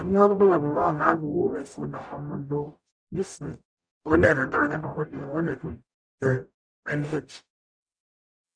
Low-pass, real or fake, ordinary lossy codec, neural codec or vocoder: 9.9 kHz; fake; none; codec, 44.1 kHz, 0.9 kbps, DAC